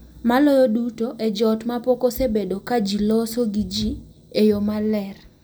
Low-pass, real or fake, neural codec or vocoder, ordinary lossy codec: none; real; none; none